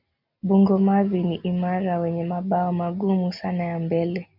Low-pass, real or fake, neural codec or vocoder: 5.4 kHz; real; none